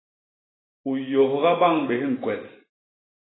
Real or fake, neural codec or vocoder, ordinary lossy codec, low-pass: real; none; AAC, 16 kbps; 7.2 kHz